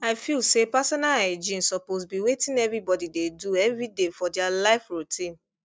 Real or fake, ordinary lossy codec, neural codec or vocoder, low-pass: real; none; none; none